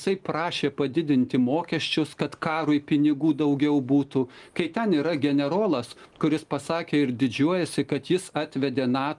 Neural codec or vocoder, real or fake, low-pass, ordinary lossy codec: none; real; 10.8 kHz; Opus, 32 kbps